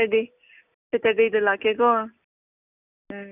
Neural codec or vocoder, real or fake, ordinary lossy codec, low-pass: none; real; none; 3.6 kHz